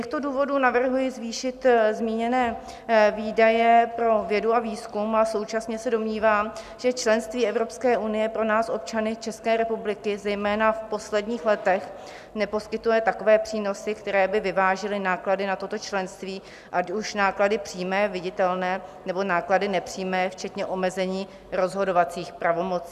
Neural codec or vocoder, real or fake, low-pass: none; real; 14.4 kHz